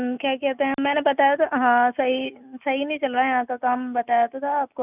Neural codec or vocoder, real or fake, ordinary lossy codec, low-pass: none; real; none; 3.6 kHz